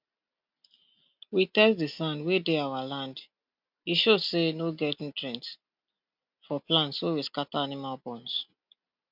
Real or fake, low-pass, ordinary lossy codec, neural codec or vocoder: real; 5.4 kHz; MP3, 48 kbps; none